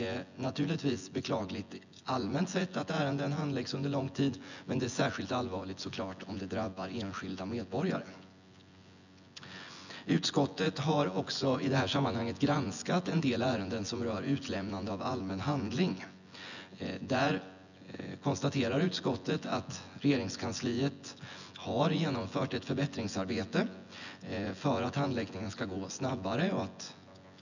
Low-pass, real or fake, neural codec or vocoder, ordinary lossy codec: 7.2 kHz; fake; vocoder, 24 kHz, 100 mel bands, Vocos; none